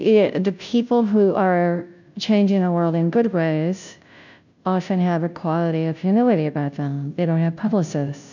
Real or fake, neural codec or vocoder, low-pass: fake; codec, 16 kHz, 0.5 kbps, FunCodec, trained on Chinese and English, 25 frames a second; 7.2 kHz